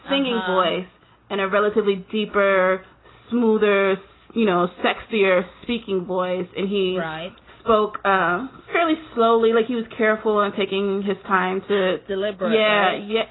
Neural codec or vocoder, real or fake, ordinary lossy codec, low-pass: none; real; AAC, 16 kbps; 7.2 kHz